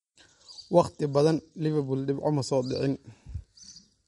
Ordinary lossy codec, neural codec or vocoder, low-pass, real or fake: MP3, 48 kbps; none; 19.8 kHz; real